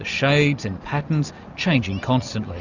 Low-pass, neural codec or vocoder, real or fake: 7.2 kHz; none; real